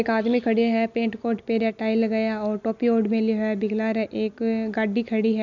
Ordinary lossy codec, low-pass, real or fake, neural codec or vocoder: none; 7.2 kHz; real; none